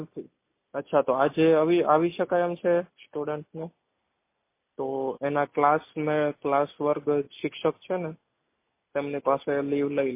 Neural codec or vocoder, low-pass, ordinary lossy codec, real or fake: none; 3.6 kHz; MP3, 24 kbps; real